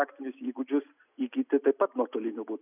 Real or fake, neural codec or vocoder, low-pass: real; none; 3.6 kHz